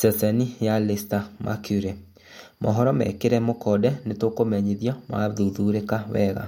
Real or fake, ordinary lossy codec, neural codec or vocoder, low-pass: real; MP3, 64 kbps; none; 19.8 kHz